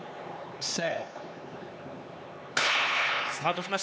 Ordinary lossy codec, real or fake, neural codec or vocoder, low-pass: none; fake; codec, 16 kHz, 4 kbps, X-Codec, HuBERT features, trained on LibriSpeech; none